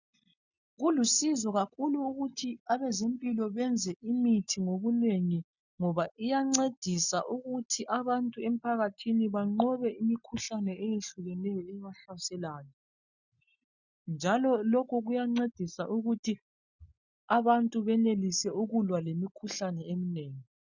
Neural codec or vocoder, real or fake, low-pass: none; real; 7.2 kHz